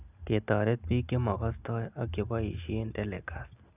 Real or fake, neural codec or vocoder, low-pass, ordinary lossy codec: real; none; 3.6 kHz; none